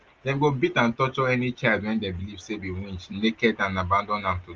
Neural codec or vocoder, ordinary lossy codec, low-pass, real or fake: none; Opus, 24 kbps; 7.2 kHz; real